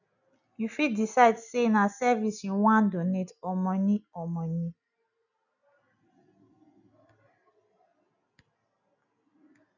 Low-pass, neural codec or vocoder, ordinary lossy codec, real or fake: 7.2 kHz; none; none; real